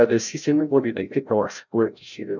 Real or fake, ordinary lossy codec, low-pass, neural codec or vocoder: fake; none; 7.2 kHz; codec, 16 kHz, 0.5 kbps, FreqCodec, larger model